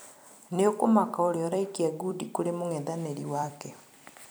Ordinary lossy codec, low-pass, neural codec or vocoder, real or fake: none; none; vocoder, 44.1 kHz, 128 mel bands every 256 samples, BigVGAN v2; fake